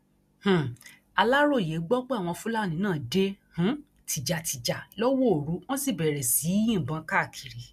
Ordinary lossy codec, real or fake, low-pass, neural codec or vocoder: MP3, 96 kbps; real; 14.4 kHz; none